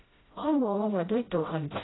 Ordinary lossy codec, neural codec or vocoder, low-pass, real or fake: AAC, 16 kbps; codec, 16 kHz, 0.5 kbps, FreqCodec, smaller model; 7.2 kHz; fake